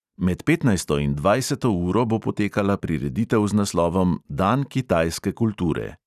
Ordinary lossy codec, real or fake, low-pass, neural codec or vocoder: none; real; 14.4 kHz; none